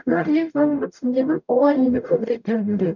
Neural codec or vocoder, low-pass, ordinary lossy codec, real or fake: codec, 44.1 kHz, 0.9 kbps, DAC; 7.2 kHz; none; fake